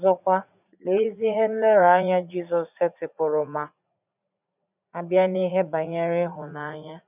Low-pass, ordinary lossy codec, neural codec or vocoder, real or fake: 3.6 kHz; none; vocoder, 44.1 kHz, 80 mel bands, Vocos; fake